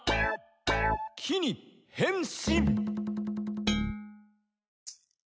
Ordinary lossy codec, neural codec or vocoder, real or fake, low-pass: none; none; real; none